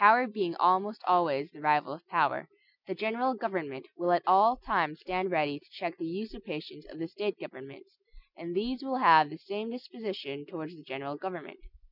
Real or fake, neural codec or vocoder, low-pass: real; none; 5.4 kHz